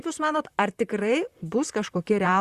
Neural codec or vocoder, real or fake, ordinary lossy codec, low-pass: vocoder, 44.1 kHz, 128 mel bands, Pupu-Vocoder; fake; Opus, 64 kbps; 14.4 kHz